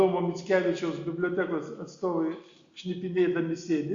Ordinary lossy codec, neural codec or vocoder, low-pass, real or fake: Opus, 64 kbps; none; 7.2 kHz; real